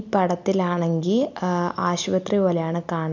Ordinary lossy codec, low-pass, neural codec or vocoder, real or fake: none; 7.2 kHz; none; real